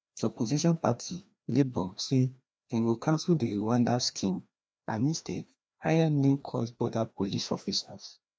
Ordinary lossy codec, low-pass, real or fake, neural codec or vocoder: none; none; fake; codec, 16 kHz, 1 kbps, FreqCodec, larger model